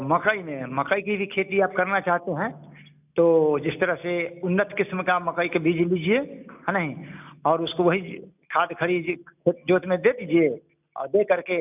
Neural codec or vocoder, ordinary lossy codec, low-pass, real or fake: none; none; 3.6 kHz; real